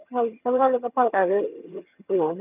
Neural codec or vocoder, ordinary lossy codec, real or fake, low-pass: vocoder, 22.05 kHz, 80 mel bands, HiFi-GAN; none; fake; 3.6 kHz